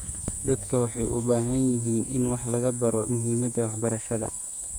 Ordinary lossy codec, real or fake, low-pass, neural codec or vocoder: none; fake; none; codec, 44.1 kHz, 2.6 kbps, SNAC